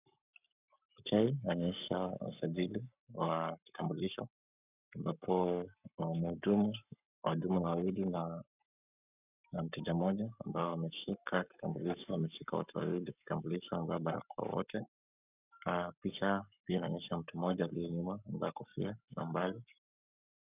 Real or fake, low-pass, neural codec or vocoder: fake; 3.6 kHz; codec, 44.1 kHz, 7.8 kbps, Pupu-Codec